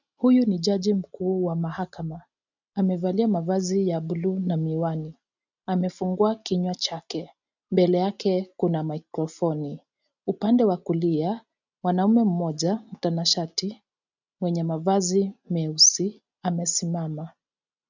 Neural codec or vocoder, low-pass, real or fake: none; 7.2 kHz; real